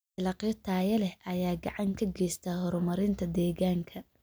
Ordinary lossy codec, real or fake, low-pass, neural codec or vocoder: none; real; none; none